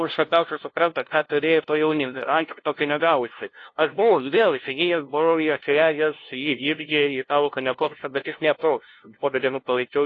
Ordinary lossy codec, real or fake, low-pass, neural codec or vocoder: AAC, 32 kbps; fake; 7.2 kHz; codec, 16 kHz, 0.5 kbps, FunCodec, trained on LibriTTS, 25 frames a second